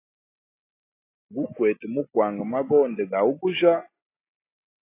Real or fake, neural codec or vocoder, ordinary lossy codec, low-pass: real; none; AAC, 24 kbps; 3.6 kHz